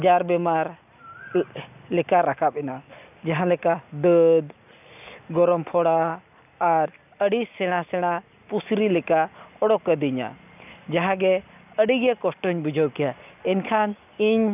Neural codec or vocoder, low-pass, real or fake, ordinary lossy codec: none; 3.6 kHz; real; none